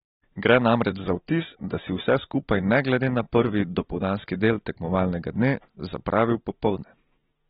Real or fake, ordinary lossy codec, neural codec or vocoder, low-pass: real; AAC, 16 kbps; none; 7.2 kHz